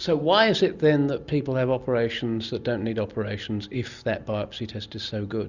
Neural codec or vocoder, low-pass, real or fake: none; 7.2 kHz; real